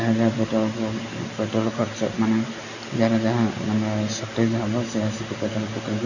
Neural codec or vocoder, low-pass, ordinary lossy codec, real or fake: none; 7.2 kHz; none; real